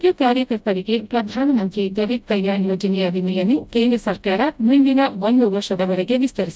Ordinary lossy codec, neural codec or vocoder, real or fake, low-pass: none; codec, 16 kHz, 0.5 kbps, FreqCodec, smaller model; fake; none